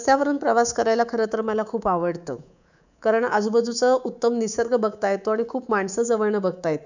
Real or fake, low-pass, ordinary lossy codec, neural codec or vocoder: fake; 7.2 kHz; none; codec, 24 kHz, 3.1 kbps, DualCodec